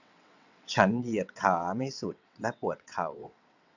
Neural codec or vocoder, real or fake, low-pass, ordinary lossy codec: vocoder, 22.05 kHz, 80 mel bands, WaveNeXt; fake; 7.2 kHz; none